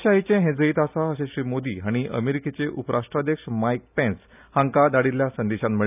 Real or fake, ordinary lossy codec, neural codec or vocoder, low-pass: real; none; none; 3.6 kHz